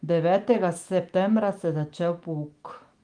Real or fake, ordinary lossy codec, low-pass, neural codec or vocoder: fake; Opus, 24 kbps; 9.9 kHz; autoencoder, 48 kHz, 128 numbers a frame, DAC-VAE, trained on Japanese speech